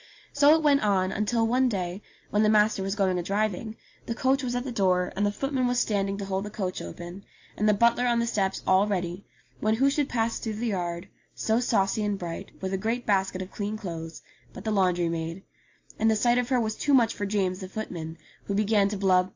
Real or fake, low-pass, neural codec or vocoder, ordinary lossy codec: real; 7.2 kHz; none; AAC, 48 kbps